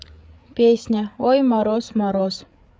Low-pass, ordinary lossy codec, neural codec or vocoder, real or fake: none; none; codec, 16 kHz, 8 kbps, FreqCodec, larger model; fake